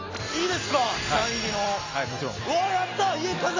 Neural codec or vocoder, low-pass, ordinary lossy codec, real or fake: none; 7.2 kHz; AAC, 32 kbps; real